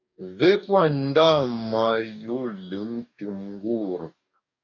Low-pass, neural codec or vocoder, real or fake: 7.2 kHz; codec, 44.1 kHz, 2.6 kbps, DAC; fake